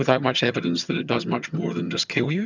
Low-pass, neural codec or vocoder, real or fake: 7.2 kHz; vocoder, 22.05 kHz, 80 mel bands, HiFi-GAN; fake